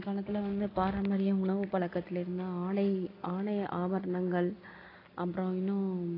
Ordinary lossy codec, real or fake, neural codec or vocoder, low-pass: AAC, 24 kbps; real; none; 5.4 kHz